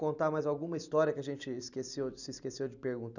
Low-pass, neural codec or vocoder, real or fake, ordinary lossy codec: 7.2 kHz; none; real; none